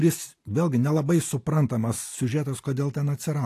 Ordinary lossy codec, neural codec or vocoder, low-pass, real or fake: AAC, 64 kbps; none; 14.4 kHz; real